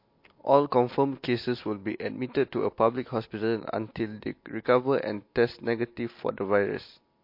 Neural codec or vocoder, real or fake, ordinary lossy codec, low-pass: none; real; MP3, 32 kbps; 5.4 kHz